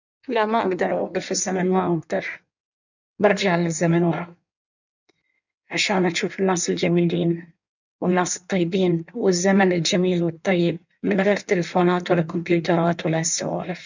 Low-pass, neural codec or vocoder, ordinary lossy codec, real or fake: 7.2 kHz; codec, 16 kHz in and 24 kHz out, 1.1 kbps, FireRedTTS-2 codec; none; fake